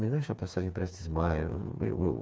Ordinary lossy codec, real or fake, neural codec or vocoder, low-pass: none; fake; codec, 16 kHz, 4 kbps, FreqCodec, smaller model; none